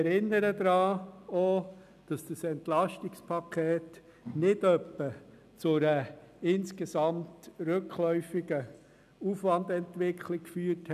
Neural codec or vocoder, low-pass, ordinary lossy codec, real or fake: none; 14.4 kHz; none; real